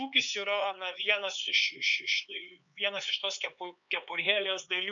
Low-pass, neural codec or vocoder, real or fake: 7.2 kHz; codec, 16 kHz, 4 kbps, X-Codec, HuBERT features, trained on LibriSpeech; fake